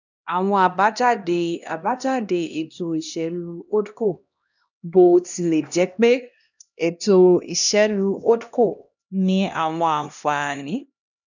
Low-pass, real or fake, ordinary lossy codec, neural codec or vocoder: 7.2 kHz; fake; none; codec, 16 kHz, 1 kbps, X-Codec, HuBERT features, trained on LibriSpeech